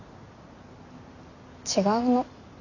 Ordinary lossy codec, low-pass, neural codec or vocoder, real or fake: none; 7.2 kHz; none; real